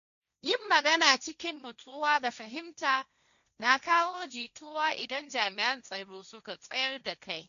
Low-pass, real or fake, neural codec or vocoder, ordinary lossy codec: 7.2 kHz; fake; codec, 16 kHz, 1.1 kbps, Voila-Tokenizer; none